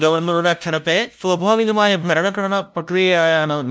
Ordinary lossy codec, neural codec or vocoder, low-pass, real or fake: none; codec, 16 kHz, 0.5 kbps, FunCodec, trained on LibriTTS, 25 frames a second; none; fake